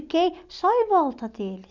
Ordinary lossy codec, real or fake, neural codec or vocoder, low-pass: none; real; none; 7.2 kHz